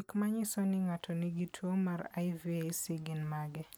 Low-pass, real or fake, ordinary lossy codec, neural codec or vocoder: none; real; none; none